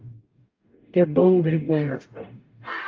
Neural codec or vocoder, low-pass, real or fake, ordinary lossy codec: codec, 44.1 kHz, 0.9 kbps, DAC; 7.2 kHz; fake; Opus, 24 kbps